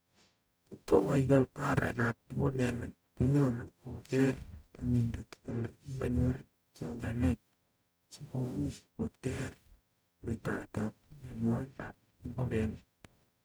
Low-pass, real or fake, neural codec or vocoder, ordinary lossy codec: none; fake; codec, 44.1 kHz, 0.9 kbps, DAC; none